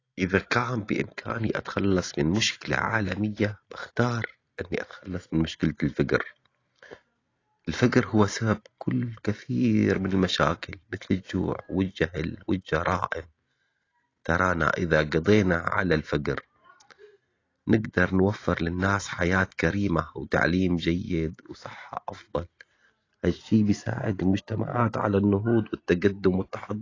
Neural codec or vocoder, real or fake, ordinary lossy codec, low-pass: none; real; AAC, 32 kbps; 7.2 kHz